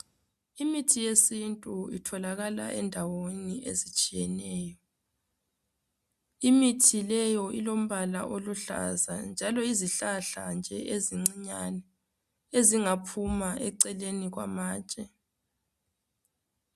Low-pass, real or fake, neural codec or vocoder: 14.4 kHz; real; none